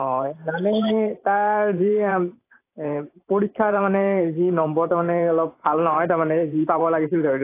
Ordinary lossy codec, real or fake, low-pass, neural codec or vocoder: AAC, 24 kbps; fake; 3.6 kHz; vocoder, 44.1 kHz, 128 mel bands every 512 samples, BigVGAN v2